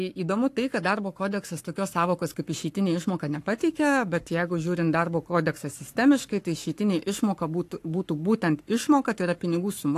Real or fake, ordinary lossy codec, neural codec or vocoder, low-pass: fake; AAC, 64 kbps; codec, 44.1 kHz, 7.8 kbps, Pupu-Codec; 14.4 kHz